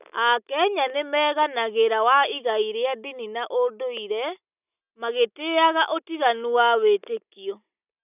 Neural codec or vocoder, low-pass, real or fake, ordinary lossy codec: none; 3.6 kHz; real; none